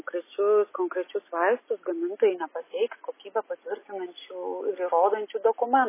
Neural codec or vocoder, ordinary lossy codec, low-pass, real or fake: none; MP3, 16 kbps; 3.6 kHz; real